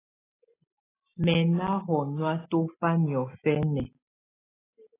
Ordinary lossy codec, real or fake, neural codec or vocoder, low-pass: AAC, 16 kbps; real; none; 3.6 kHz